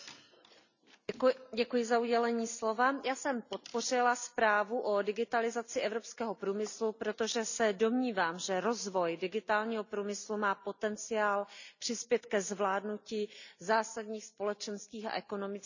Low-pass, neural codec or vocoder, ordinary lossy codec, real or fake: 7.2 kHz; none; none; real